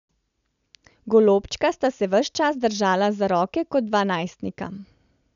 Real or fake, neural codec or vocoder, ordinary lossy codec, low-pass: real; none; none; 7.2 kHz